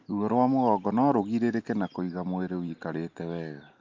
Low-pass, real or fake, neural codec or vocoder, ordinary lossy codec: 7.2 kHz; real; none; Opus, 24 kbps